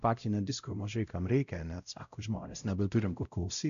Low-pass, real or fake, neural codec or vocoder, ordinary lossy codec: 7.2 kHz; fake; codec, 16 kHz, 0.5 kbps, X-Codec, WavLM features, trained on Multilingual LibriSpeech; MP3, 96 kbps